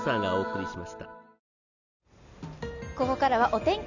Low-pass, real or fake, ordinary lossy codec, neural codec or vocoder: 7.2 kHz; real; none; none